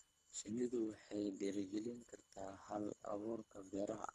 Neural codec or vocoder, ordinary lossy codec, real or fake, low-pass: codec, 24 kHz, 3 kbps, HILCodec; none; fake; none